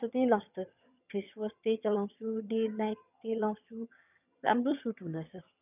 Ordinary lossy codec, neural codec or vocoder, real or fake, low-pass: none; vocoder, 22.05 kHz, 80 mel bands, HiFi-GAN; fake; 3.6 kHz